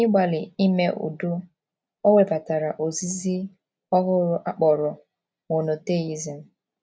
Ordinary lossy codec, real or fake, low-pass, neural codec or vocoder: none; real; none; none